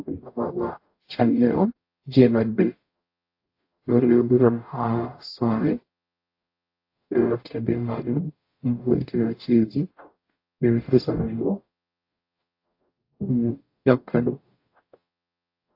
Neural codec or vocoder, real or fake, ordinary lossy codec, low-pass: codec, 44.1 kHz, 0.9 kbps, DAC; fake; AAC, 32 kbps; 5.4 kHz